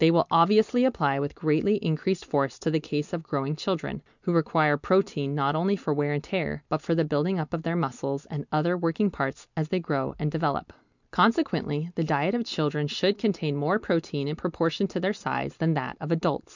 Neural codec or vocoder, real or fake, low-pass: none; real; 7.2 kHz